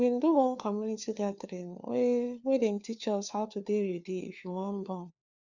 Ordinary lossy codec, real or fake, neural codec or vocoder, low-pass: none; fake; codec, 16 kHz, 4 kbps, FunCodec, trained on LibriTTS, 50 frames a second; 7.2 kHz